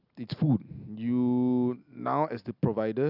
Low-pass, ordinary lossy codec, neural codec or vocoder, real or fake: 5.4 kHz; none; none; real